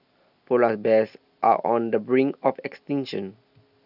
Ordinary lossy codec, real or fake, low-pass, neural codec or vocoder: none; real; 5.4 kHz; none